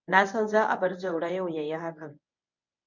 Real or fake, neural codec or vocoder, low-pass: fake; codec, 24 kHz, 0.9 kbps, WavTokenizer, medium speech release version 1; 7.2 kHz